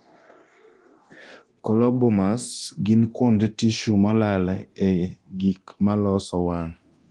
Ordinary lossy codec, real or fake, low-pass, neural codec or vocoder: Opus, 24 kbps; fake; 10.8 kHz; codec, 24 kHz, 0.9 kbps, DualCodec